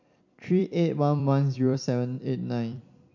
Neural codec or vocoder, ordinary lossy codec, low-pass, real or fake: vocoder, 44.1 kHz, 128 mel bands every 256 samples, BigVGAN v2; none; 7.2 kHz; fake